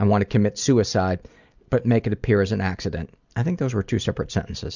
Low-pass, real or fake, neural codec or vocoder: 7.2 kHz; real; none